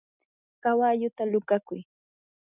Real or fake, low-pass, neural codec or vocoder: real; 3.6 kHz; none